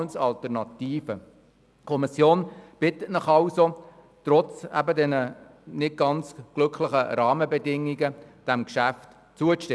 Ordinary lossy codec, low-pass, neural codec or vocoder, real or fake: none; none; none; real